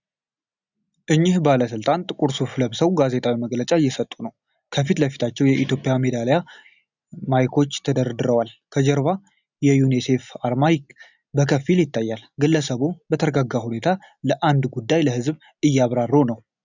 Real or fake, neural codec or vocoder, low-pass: real; none; 7.2 kHz